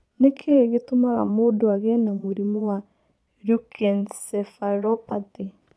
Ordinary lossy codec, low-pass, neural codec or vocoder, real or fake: none; none; vocoder, 22.05 kHz, 80 mel bands, Vocos; fake